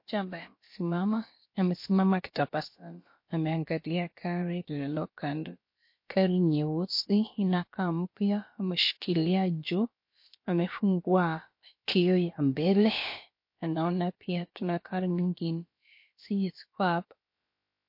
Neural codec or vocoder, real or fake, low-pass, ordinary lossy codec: codec, 16 kHz, about 1 kbps, DyCAST, with the encoder's durations; fake; 5.4 kHz; MP3, 32 kbps